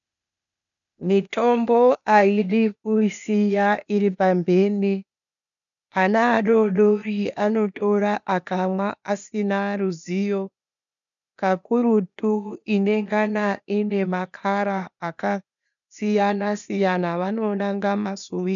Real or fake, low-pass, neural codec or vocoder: fake; 7.2 kHz; codec, 16 kHz, 0.8 kbps, ZipCodec